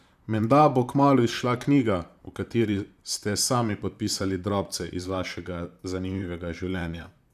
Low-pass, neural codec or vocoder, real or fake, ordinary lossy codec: 14.4 kHz; vocoder, 44.1 kHz, 128 mel bands, Pupu-Vocoder; fake; none